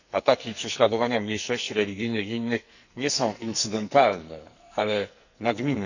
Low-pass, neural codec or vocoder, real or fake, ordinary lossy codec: 7.2 kHz; codec, 44.1 kHz, 2.6 kbps, SNAC; fake; none